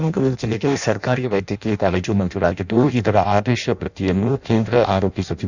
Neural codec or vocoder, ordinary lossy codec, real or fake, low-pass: codec, 16 kHz in and 24 kHz out, 0.6 kbps, FireRedTTS-2 codec; none; fake; 7.2 kHz